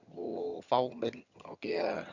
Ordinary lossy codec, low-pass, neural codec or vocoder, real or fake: Opus, 64 kbps; 7.2 kHz; vocoder, 22.05 kHz, 80 mel bands, HiFi-GAN; fake